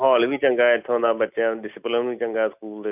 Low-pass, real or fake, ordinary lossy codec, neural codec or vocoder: 3.6 kHz; real; none; none